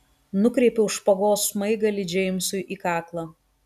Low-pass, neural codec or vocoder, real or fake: 14.4 kHz; none; real